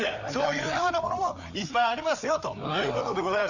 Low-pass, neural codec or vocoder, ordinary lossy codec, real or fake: 7.2 kHz; codec, 16 kHz, 4 kbps, FreqCodec, larger model; none; fake